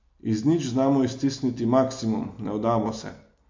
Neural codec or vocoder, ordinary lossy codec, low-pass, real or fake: none; MP3, 48 kbps; 7.2 kHz; real